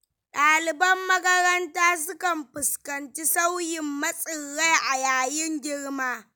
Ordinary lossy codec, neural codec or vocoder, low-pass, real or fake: none; none; none; real